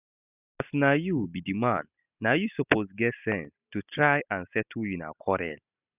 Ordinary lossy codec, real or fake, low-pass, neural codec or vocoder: none; real; 3.6 kHz; none